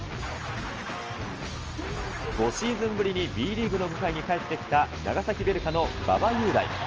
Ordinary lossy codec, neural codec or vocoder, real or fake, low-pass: Opus, 24 kbps; none; real; 7.2 kHz